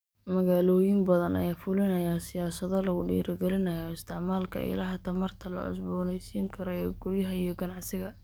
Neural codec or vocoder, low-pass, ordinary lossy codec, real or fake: codec, 44.1 kHz, 7.8 kbps, DAC; none; none; fake